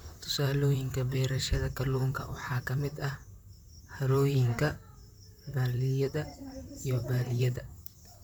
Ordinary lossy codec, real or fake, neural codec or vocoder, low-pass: none; fake; vocoder, 44.1 kHz, 128 mel bands, Pupu-Vocoder; none